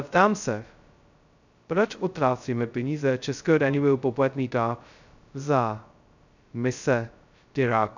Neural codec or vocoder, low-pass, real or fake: codec, 16 kHz, 0.2 kbps, FocalCodec; 7.2 kHz; fake